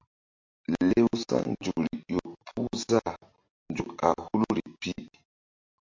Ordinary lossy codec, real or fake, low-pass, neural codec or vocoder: MP3, 48 kbps; real; 7.2 kHz; none